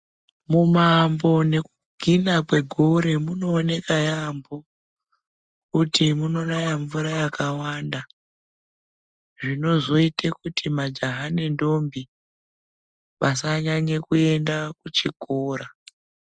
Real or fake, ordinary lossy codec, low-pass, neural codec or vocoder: real; Opus, 64 kbps; 9.9 kHz; none